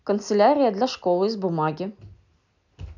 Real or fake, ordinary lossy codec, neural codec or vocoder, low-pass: real; none; none; 7.2 kHz